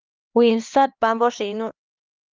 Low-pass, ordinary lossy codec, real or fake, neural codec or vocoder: 7.2 kHz; Opus, 32 kbps; fake; codec, 16 kHz, 2 kbps, X-Codec, HuBERT features, trained on LibriSpeech